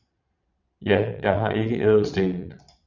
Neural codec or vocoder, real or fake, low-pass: vocoder, 22.05 kHz, 80 mel bands, Vocos; fake; 7.2 kHz